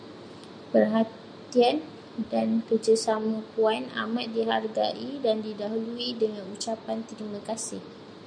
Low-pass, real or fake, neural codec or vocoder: 9.9 kHz; real; none